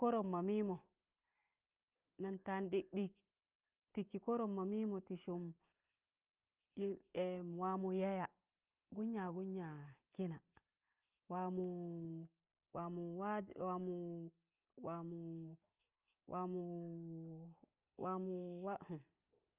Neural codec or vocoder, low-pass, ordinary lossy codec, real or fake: none; 3.6 kHz; Opus, 24 kbps; real